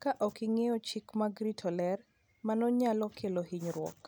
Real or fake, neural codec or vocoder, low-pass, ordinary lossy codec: real; none; none; none